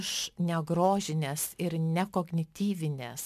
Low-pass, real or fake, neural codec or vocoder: 14.4 kHz; real; none